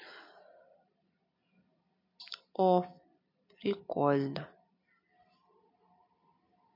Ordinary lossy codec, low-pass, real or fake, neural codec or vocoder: MP3, 32 kbps; 5.4 kHz; real; none